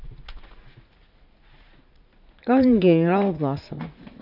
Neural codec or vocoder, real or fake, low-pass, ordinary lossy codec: vocoder, 44.1 kHz, 80 mel bands, Vocos; fake; 5.4 kHz; none